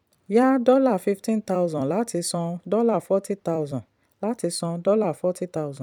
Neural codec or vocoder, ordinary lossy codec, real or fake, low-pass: vocoder, 44.1 kHz, 128 mel bands every 256 samples, BigVGAN v2; none; fake; 19.8 kHz